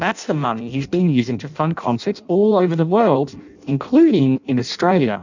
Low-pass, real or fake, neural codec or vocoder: 7.2 kHz; fake; codec, 16 kHz in and 24 kHz out, 0.6 kbps, FireRedTTS-2 codec